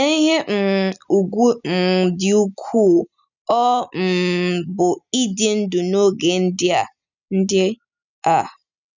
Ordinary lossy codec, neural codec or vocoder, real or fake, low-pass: none; none; real; 7.2 kHz